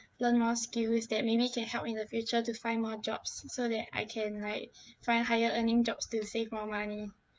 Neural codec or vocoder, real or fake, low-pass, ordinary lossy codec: codec, 16 kHz, 8 kbps, FreqCodec, smaller model; fake; none; none